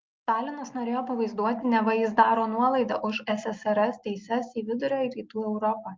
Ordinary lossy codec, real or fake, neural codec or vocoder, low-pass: Opus, 32 kbps; real; none; 7.2 kHz